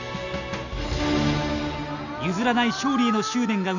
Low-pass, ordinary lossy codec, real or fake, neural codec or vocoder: 7.2 kHz; none; real; none